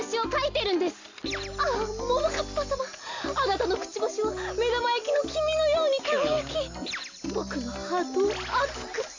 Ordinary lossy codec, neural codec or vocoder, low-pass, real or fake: AAC, 48 kbps; none; 7.2 kHz; real